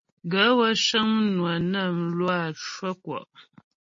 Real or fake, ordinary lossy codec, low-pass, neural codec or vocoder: real; MP3, 32 kbps; 7.2 kHz; none